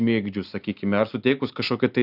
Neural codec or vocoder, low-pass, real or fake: none; 5.4 kHz; real